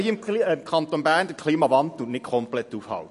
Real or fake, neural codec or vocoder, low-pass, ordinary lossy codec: real; none; 14.4 kHz; MP3, 48 kbps